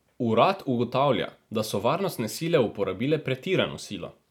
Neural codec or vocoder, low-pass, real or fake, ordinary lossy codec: none; 19.8 kHz; real; none